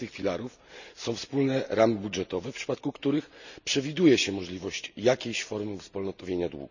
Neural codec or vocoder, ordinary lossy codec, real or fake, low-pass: none; none; real; 7.2 kHz